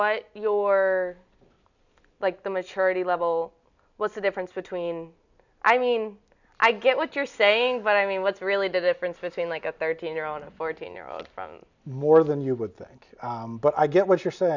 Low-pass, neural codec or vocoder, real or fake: 7.2 kHz; none; real